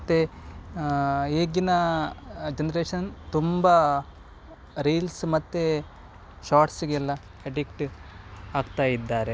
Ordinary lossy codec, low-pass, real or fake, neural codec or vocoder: none; none; real; none